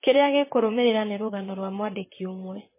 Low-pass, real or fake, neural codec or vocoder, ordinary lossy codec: 3.6 kHz; fake; vocoder, 44.1 kHz, 128 mel bands, Pupu-Vocoder; MP3, 16 kbps